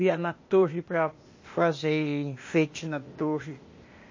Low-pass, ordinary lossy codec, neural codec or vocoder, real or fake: 7.2 kHz; MP3, 32 kbps; codec, 16 kHz, 0.8 kbps, ZipCodec; fake